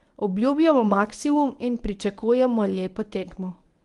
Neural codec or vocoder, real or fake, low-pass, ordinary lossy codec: codec, 24 kHz, 0.9 kbps, WavTokenizer, medium speech release version 1; fake; 10.8 kHz; Opus, 24 kbps